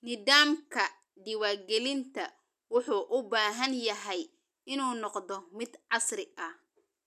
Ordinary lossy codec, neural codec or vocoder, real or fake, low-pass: none; none; real; 14.4 kHz